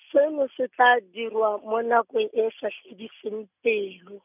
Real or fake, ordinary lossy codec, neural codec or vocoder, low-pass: real; none; none; 3.6 kHz